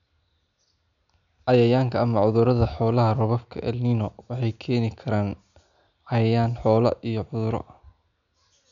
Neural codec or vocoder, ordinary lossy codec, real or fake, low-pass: none; none; real; 7.2 kHz